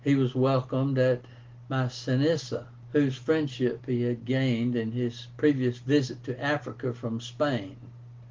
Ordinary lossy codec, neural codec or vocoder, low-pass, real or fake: Opus, 16 kbps; none; 7.2 kHz; real